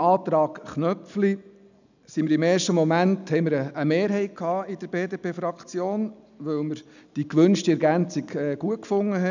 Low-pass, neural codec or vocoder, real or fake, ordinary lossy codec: 7.2 kHz; none; real; none